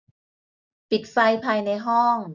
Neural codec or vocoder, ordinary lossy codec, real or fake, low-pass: none; none; real; 7.2 kHz